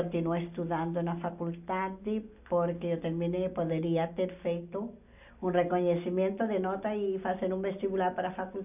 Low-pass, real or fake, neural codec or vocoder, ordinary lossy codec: 3.6 kHz; real; none; none